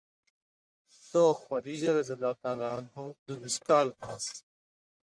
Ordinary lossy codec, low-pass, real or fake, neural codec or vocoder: MP3, 64 kbps; 9.9 kHz; fake; codec, 44.1 kHz, 1.7 kbps, Pupu-Codec